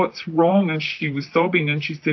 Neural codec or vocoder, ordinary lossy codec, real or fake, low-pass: vocoder, 44.1 kHz, 128 mel bands, Pupu-Vocoder; AAC, 48 kbps; fake; 7.2 kHz